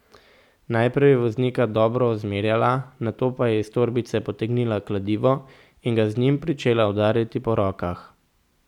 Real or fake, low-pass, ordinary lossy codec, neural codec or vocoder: real; 19.8 kHz; none; none